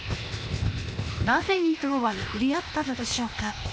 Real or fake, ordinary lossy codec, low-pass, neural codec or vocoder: fake; none; none; codec, 16 kHz, 0.8 kbps, ZipCodec